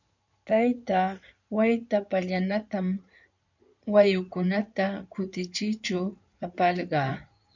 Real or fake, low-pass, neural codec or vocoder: fake; 7.2 kHz; codec, 16 kHz in and 24 kHz out, 2.2 kbps, FireRedTTS-2 codec